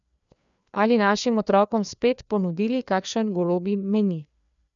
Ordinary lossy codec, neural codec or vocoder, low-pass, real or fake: none; codec, 16 kHz, 2 kbps, FreqCodec, larger model; 7.2 kHz; fake